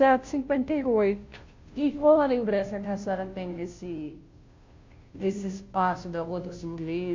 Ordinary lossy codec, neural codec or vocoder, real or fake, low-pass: MP3, 48 kbps; codec, 16 kHz, 0.5 kbps, FunCodec, trained on Chinese and English, 25 frames a second; fake; 7.2 kHz